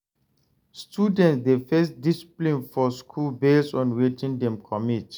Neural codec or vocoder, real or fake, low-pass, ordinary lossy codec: none; real; none; none